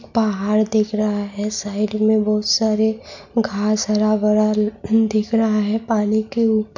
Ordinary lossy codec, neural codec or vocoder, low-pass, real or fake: none; none; 7.2 kHz; real